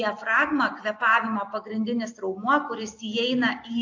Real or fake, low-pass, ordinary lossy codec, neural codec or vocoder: real; 7.2 kHz; AAC, 48 kbps; none